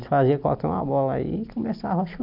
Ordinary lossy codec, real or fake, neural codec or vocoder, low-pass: none; real; none; 5.4 kHz